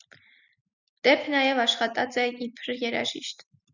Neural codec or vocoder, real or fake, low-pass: none; real; 7.2 kHz